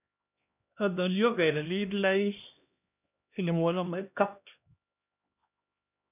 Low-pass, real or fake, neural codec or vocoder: 3.6 kHz; fake; codec, 16 kHz, 1 kbps, X-Codec, HuBERT features, trained on LibriSpeech